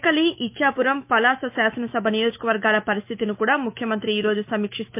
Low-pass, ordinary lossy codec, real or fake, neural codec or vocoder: 3.6 kHz; MP3, 32 kbps; fake; autoencoder, 48 kHz, 128 numbers a frame, DAC-VAE, trained on Japanese speech